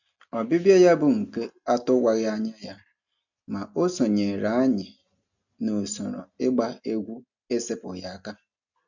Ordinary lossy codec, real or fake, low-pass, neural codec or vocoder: none; real; 7.2 kHz; none